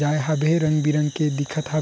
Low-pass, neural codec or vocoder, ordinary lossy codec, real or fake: none; none; none; real